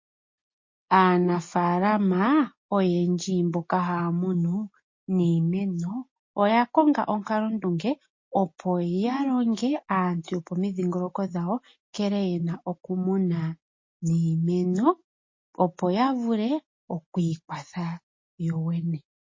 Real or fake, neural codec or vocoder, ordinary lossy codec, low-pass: real; none; MP3, 32 kbps; 7.2 kHz